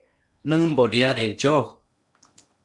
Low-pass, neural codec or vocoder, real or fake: 10.8 kHz; codec, 16 kHz in and 24 kHz out, 0.8 kbps, FocalCodec, streaming, 65536 codes; fake